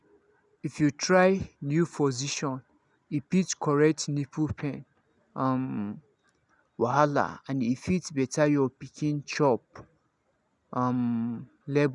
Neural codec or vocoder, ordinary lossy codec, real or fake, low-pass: none; none; real; 10.8 kHz